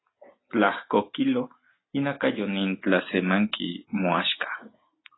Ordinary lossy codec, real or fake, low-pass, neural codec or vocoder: AAC, 16 kbps; real; 7.2 kHz; none